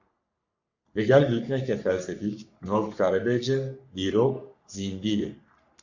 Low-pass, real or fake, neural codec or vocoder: 7.2 kHz; fake; codec, 44.1 kHz, 3.4 kbps, Pupu-Codec